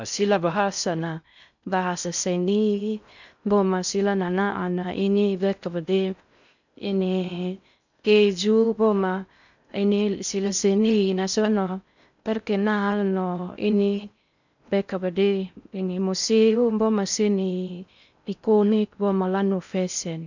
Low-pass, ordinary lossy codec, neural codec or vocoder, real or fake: 7.2 kHz; none; codec, 16 kHz in and 24 kHz out, 0.6 kbps, FocalCodec, streaming, 4096 codes; fake